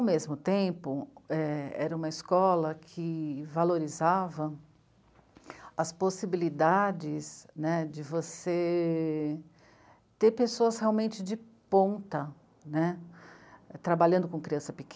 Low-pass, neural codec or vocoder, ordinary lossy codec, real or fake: none; none; none; real